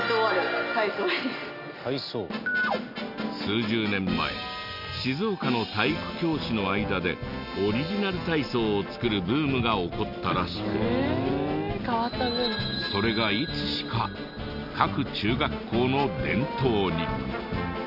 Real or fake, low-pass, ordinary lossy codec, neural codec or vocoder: real; 5.4 kHz; none; none